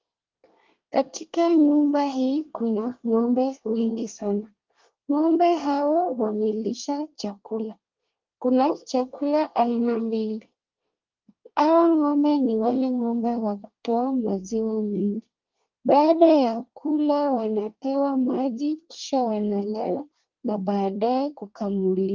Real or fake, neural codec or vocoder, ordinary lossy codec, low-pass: fake; codec, 24 kHz, 1 kbps, SNAC; Opus, 32 kbps; 7.2 kHz